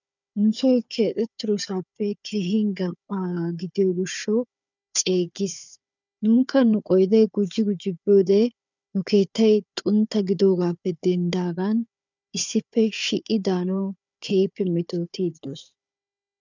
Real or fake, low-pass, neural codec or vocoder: fake; 7.2 kHz; codec, 16 kHz, 4 kbps, FunCodec, trained on Chinese and English, 50 frames a second